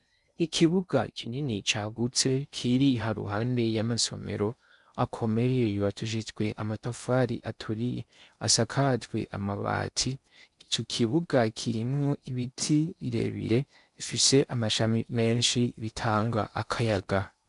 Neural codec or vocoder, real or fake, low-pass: codec, 16 kHz in and 24 kHz out, 0.6 kbps, FocalCodec, streaming, 4096 codes; fake; 10.8 kHz